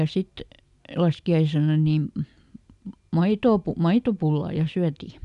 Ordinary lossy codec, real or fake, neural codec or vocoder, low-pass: none; real; none; 10.8 kHz